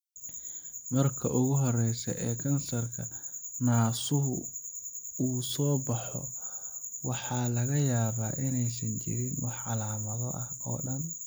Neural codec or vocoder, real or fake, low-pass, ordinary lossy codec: none; real; none; none